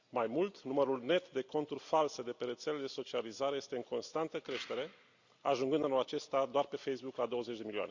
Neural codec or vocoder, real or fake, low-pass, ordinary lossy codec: none; real; 7.2 kHz; Opus, 64 kbps